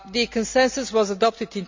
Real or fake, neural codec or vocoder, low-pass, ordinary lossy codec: real; none; 7.2 kHz; MP3, 64 kbps